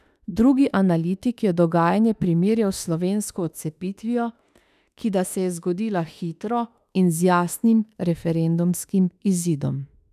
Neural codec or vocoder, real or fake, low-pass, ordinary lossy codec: autoencoder, 48 kHz, 32 numbers a frame, DAC-VAE, trained on Japanese speech; fake; 14.4 kHz; none